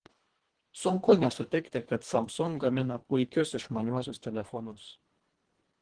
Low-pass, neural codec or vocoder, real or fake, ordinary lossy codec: 9.9 kHz; codec, 24 kHz, 1.5 kbps, HILCodec; fake; Opus, 16 kbps